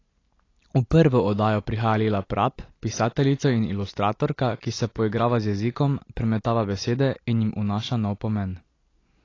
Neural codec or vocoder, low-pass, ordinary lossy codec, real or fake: none; 7.2 kHz; AAC, 32 kbps; real